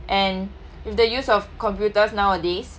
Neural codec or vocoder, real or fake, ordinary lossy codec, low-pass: none; real; none; none